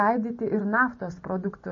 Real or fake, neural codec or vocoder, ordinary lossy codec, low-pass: real; none; MP3, 32 kbps; 7.2 kHz